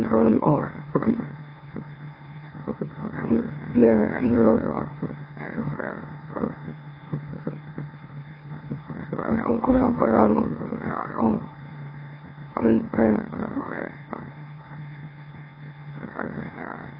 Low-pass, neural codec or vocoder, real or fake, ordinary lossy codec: 5.4 kHz; autoencoder, 44.1 kHz, a latent of 192 numbers a frame, MeloTTS; fake; AAC, 24 kbps